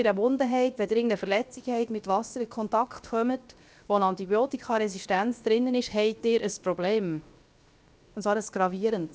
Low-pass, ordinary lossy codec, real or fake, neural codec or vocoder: none; none; fake; codec, 16 kHz, 0.7 kbps, FocalCodec